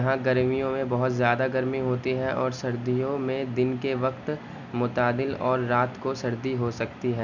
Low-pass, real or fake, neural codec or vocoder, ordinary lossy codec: 7.2 kHz; real; none; none